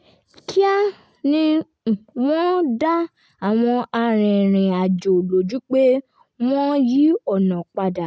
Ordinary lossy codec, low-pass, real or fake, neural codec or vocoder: none; none; real; none